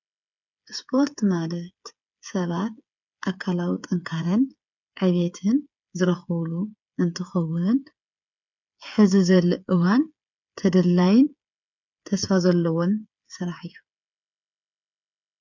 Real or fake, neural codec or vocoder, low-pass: fake; codec, 16 kHz, 8 kbps, FreqCodec, smaller model; 7.2 kHz